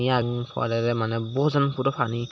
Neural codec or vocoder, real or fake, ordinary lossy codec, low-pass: none; real; none; none